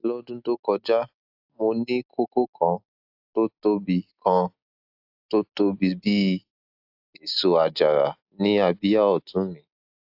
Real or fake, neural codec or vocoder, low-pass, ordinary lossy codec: real; none; 5.4 kHz; AAC, 48 kbps